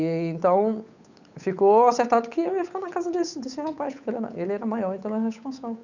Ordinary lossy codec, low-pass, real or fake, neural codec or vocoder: none; 7.2 kHz; fake; codec, 16 kHz, 8 kbps, FunCodec, trained on Chinese and English, 25 frames a second